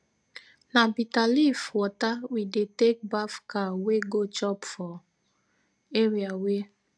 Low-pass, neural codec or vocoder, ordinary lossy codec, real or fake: none; none; none; real